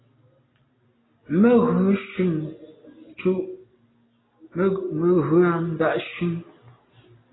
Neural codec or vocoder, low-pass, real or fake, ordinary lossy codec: none; 7.2 kHz; real; AAC, 16 kbps